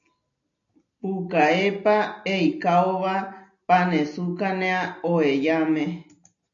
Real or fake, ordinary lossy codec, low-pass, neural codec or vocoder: real; AAC, 64 kbps; 7.2 kHz; none